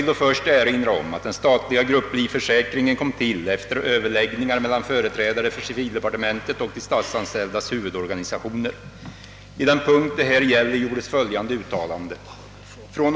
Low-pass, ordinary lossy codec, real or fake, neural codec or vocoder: none; none; real; none